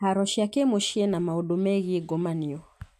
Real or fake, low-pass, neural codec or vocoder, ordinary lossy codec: real; 14.4 kHz; none; none